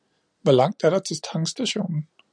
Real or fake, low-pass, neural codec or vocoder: real; 9.9 kHz; none